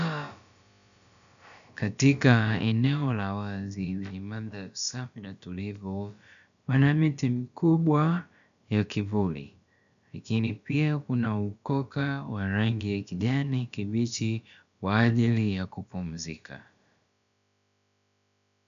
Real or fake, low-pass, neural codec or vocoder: fake; 7.2 kHz; codec, 16 kHz, about 1 kbps, DyCAST, with the encoder's durations